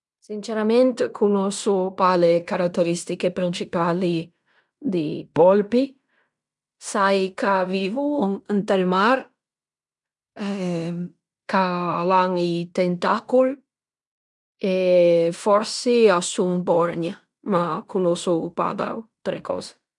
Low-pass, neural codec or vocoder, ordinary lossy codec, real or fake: 10.8 kHz; codec, 16 kHz in and 24 kHz out, 0.9 kbps, LongCat-Audio-Codec, fine tuned four codebook decoder; none; fake